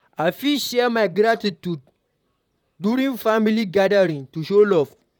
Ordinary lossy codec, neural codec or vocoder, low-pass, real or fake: none; vocoder, 44.1 kHz, 128 mel bands, Pupu-Vocoder; 19.8 kHz; fake